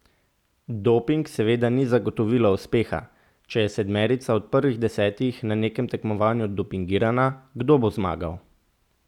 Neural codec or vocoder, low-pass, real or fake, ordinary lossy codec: none; 19.8 kHz; real; none